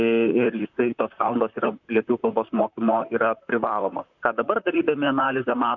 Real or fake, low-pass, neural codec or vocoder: fake; 7.2 kHz; vocoder, 44.1 kHz, 128 mel bands, Pupu-Vocoder